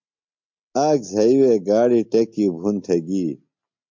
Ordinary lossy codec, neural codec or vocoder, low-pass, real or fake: MP3, 48 kbps; none; 7.2 kHz; real